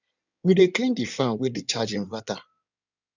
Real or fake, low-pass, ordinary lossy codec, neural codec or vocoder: fake; 7.2 kHz; none; codec, 16 kHz in and 24 kHz out, 2.2 kbps, FireRedTTS-2 codec